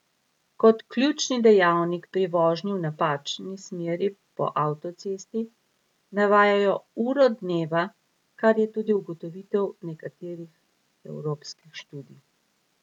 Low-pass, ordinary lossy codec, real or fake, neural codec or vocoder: 19.8 kHz; none; real; none